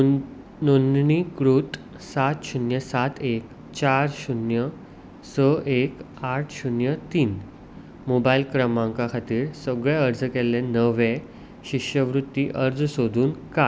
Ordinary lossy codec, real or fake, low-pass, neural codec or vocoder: none; real; none; none